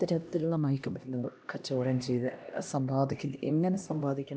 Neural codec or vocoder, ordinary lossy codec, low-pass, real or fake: codec, 16 kHz, 1 kbps, X-Codec, HuBERT features, trained on LibriSpeech; none; none; fake